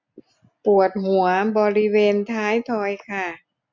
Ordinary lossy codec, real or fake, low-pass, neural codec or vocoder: MP3, 64 kbps; real; 7.2 kHz; none